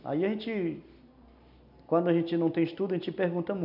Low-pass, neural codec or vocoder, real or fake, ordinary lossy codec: 5.4 kHz; none; real; none